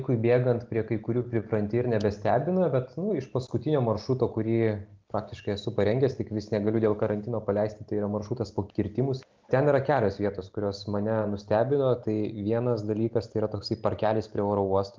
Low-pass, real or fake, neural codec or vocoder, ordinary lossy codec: 7.2 kHz; real; none; Opus, 32 kbps